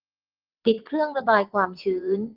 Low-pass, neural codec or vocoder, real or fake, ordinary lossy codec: 5.4 kHz; vocoder, 22.05 kHz, 80 mel bands, WaveNeXt; fake; Opus, 24 kbps